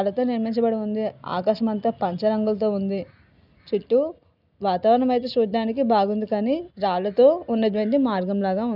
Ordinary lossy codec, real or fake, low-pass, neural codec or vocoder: none; real; 5.4 kHz; none